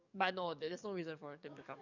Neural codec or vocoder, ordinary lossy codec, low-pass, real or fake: codec, 44.1 kHz, 7.8 kbps, DAC; none; 7.2 kHz; fake